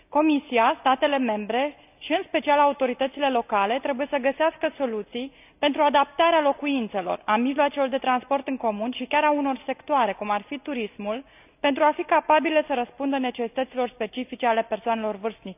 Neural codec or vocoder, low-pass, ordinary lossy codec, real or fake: none; 3.6 kHz; none; real